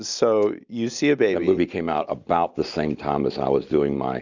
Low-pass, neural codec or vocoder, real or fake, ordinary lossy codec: 7.2 kHz; none; real; Opus, 64 kbps